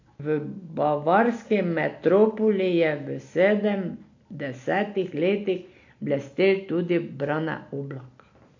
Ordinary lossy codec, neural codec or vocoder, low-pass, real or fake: AAC, 48 kbps; none; 7.2 kHz; real